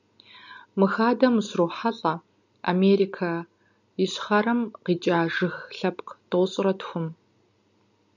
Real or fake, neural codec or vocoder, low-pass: real; none; 7.2 kHz